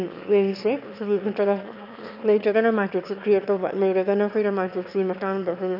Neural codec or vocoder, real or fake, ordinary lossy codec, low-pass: autoencoder, 22.05 kHz, a latent of 192 numbers a frame, VITS, trained on one speaker; fake; none; 5.4 kHz